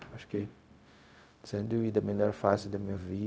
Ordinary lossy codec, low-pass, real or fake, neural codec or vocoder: none; none; fake; codec, 16 kHz, 0.4 kbps, LongCat-Audio-Codec